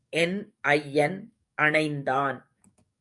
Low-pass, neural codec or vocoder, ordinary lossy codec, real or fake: 10.8 kHz; codec, 44.1 kHz, 7.8 kbps, DAC; MP3, 96 kbps; fake